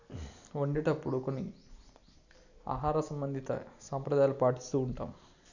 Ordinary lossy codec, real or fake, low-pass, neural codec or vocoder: none; real; 7.2 kHz; none